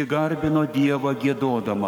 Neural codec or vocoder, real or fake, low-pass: codec, 44.1 kHz, 7.8 kbps, Pupu-Codec; fake; 19.8 kHz